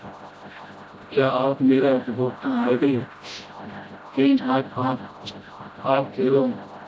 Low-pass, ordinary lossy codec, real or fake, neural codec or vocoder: none; none; fake; codec, 16 kHz, 0.5 kbps, FreqCodec, smaller model